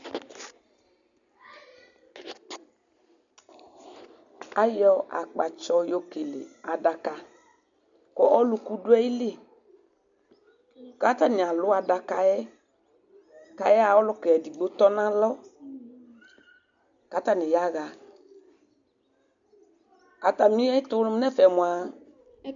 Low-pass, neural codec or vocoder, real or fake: 7.2 kHz; none; real